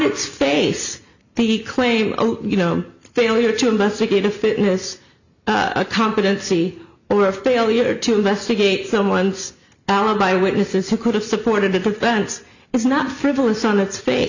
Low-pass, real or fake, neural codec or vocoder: 7.2 kHz; real; none